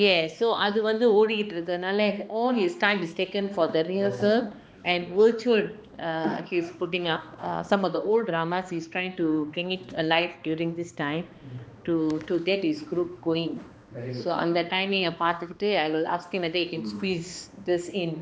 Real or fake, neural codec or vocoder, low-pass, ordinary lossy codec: fake; codec, 16 kHz, 2 kbps, X-Codec, HuBERT features, trained on balanced general audio; none; none